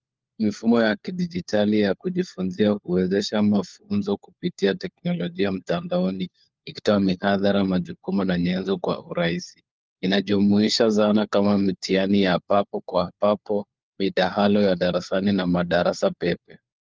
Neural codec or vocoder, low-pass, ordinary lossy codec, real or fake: codec, 16 kHz, 4 kbps, FunCodec, trained on LibriTTS, 50 frames a second; 7.2 kHz; Opus, 32 kbps; fake